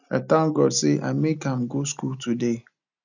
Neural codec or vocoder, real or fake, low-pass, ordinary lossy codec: none; real; 7.2 kHz; none